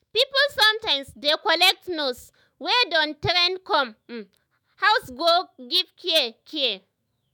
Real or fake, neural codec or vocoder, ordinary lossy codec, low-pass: fake; autoencoder, 48 kHz, 128 numbers a frame, DAC-VAE, trained on Japanese speech; none; none